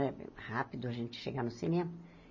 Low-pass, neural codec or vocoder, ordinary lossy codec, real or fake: 7.2 kHz; none; MP3, 32 kbps; real